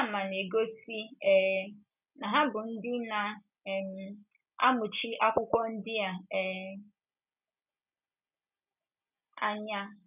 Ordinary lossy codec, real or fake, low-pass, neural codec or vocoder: none; real; 3.6 kHz; none